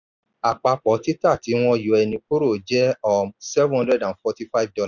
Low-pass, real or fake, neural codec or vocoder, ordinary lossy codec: 7.2 kHz; real; none; none